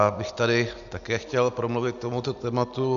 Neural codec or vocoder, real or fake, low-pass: none; real; 7.2 kHz